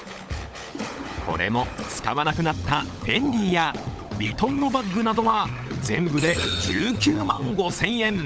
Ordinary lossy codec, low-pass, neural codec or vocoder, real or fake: none; none; codec, 16 kHz, 16 kbps, FunCodec, trained on LibriTTS, 50 frames a second; fake